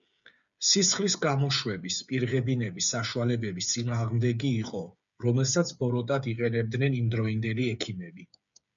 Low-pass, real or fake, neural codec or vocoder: 7.2 kHz; fake; codec, 16 kHz, 8 kbps, FreqCodec, smaller model